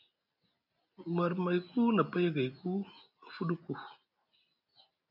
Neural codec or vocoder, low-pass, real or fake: none; 5.4 kHz; real